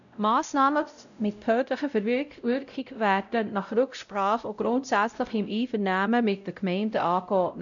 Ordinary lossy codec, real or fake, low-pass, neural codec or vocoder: none; fake; 7.2 kHz; codec, 16 kHz, 0.5 kbps, X-Codec, WavLM features, trained on Multilingual LibriSpeech